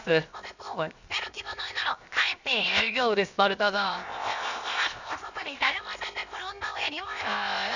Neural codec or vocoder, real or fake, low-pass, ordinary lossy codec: codec, 16 kHz, 0.7 kbps, FocalCodec; fake; 7.2 kHz; none